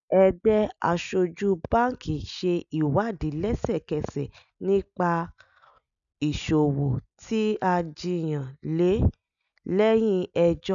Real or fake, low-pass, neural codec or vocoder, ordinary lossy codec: real; 7.2 kHz; none; none